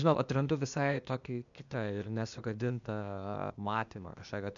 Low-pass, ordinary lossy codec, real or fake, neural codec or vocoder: 7.2 kHz; MP3, 96 kbps; fake; codec, 16 kHz, 0.8 kbps, ZipCodec